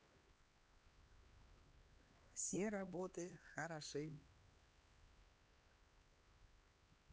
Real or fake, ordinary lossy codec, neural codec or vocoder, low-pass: fake; none; codec, 16 kHz, 2 kbps, X-Codec, HuBERT features, trained on LibriSpeech; none